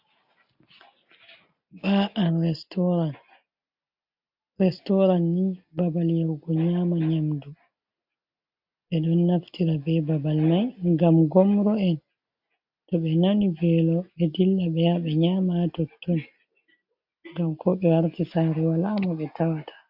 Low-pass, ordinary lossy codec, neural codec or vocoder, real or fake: 5.4 kHz; AAC, 48 kbps; none; real